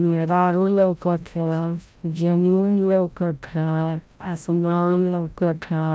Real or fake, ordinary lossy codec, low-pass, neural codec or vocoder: fake; none; none; codec, 16 kHz, 0.5 kbps, FreqCodec, larger model